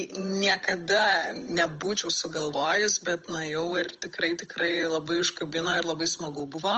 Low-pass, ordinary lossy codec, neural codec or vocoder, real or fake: 7.2 kHz; Opus, 24 kbps; codec, 16 kHz, 8 kbps, FunCodec, trained on Chinese and English, 25 frames a second; fake